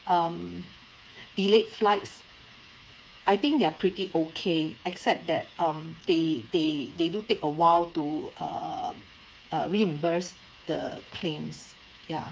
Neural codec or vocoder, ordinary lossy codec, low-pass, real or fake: codec, 16 kHz, 4 kbps, FreqCodec, smaller model; none; none; fake